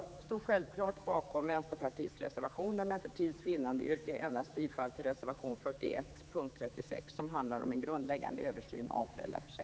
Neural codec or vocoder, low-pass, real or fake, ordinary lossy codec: codec, 16 kHz, 4 kbps, X-Codec, HuBERT features, trained on general audio; none; fake; none